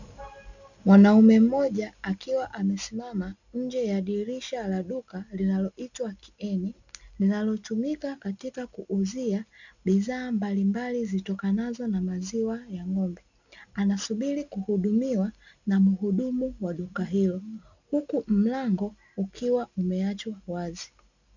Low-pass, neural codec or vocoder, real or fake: 7.2 kHz; none; real